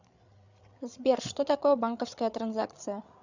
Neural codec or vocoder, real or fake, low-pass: codec, 16 kHz, 8 kbps, FreqCodec, larger model; fake; 7.2 kHz